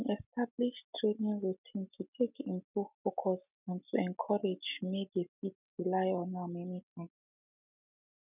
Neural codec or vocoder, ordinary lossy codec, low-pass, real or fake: none; none; 3.6 kHz; real